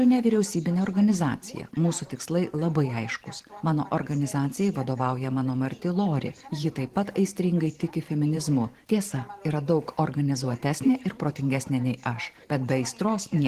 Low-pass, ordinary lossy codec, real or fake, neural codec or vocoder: 14.4 kHz; Opus, 16 kbps; fake; vocoder, 44.1 kHz, 128 mel bands every 512 samples, BigVGAN v2